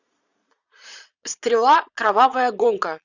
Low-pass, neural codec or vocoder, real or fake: 7.2 kHz; vocoder, 22.05 kHz, 80 mel bands, Vocos; fake